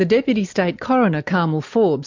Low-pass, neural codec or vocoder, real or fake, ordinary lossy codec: 7.2 kHz; none; real; MP3, 64 kbps